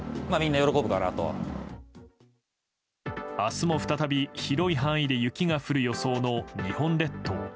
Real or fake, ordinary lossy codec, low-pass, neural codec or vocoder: real; none; none; none